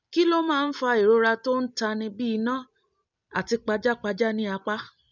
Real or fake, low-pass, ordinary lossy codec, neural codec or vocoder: real; 7.2 kHz; none; none